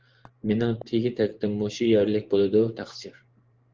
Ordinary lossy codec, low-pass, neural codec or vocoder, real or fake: Opus, 16 kbps; 7.2 kHz; vocoder, 24 kHz, 100 mel bands, Vocos; fake